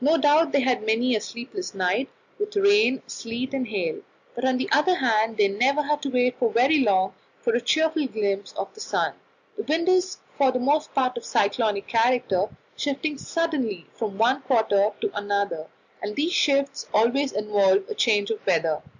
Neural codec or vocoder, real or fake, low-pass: none; real; 7.2 kHz